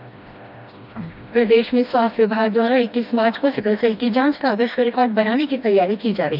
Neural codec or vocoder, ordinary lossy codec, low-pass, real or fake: codec, 16 kHz, 1 kbps, FreqCodec, smaller model; none; 5.4 kHz; fake